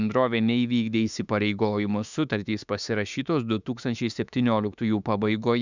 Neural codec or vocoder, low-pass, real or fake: autoencoder, 48 kHz, 32 numbers a frame, DAC-VAE, trained on Japanese speech; 7.2 kHz; fake